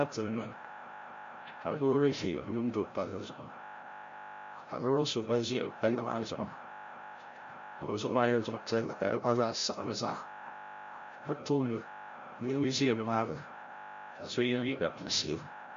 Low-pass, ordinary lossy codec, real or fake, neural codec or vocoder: 7.2 kHz; MP3, 48 kbps; fake; codec, 16 kHz, 0.5 kbps, FreqCodec, larger model